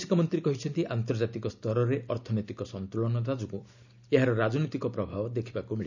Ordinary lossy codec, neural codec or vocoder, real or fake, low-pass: none; none; real; 7.2 kHz